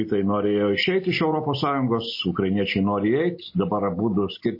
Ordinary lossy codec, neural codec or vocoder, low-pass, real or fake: MP3, 24 kbps; none; 5.4 kHz; real